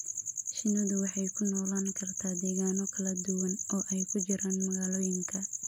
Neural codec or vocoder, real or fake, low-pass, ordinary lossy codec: none; real; none; none